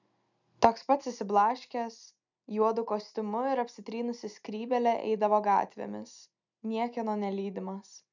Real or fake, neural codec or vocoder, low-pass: real; none; 7.2 kHz